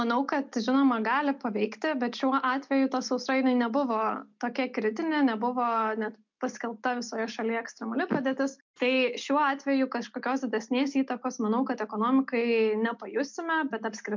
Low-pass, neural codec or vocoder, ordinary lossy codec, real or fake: 7.2 kHz; none; MP3, 64 kbps; real